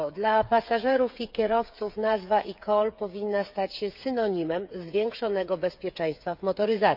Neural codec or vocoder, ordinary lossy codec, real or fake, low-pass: codec, 16 kHz, 8 kbps, FreqCodec, smaller model; none; fake; 5.4 kHz